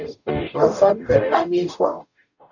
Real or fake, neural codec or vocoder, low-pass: fake; codec, 44.1 kHz, 0.9 kbps, DAC; 7.2 kHz